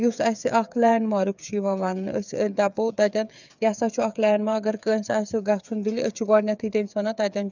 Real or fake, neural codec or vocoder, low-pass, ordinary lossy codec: fake; codec, 16 kHz, 8 kbps, FreqCodec, smaller model; 7.2 kHz; none